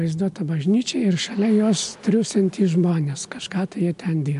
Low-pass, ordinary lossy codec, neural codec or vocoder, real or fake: 10.8 kHz; MP3, 64 kbps; none; real